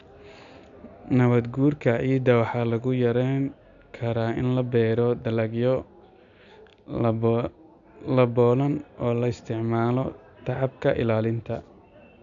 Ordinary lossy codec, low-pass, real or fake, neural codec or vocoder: none; 7.2 kHz; real; none